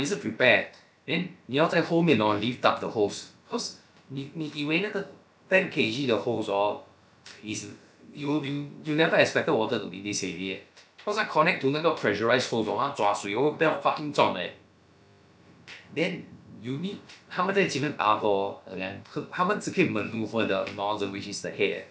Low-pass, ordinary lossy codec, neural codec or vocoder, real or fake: none; none; codec, 16 kHz, about 1 kbps, DyCAST, with the encoder's durations; fake